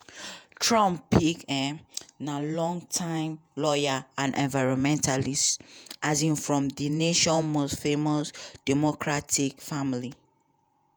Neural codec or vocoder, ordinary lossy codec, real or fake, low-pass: vocoder, 48 kHz, 128 mel bands, Vocos; none; fake; none